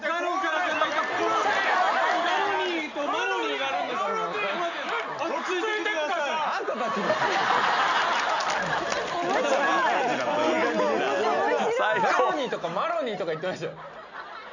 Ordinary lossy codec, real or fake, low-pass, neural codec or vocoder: none; real; 7.2 kHz; none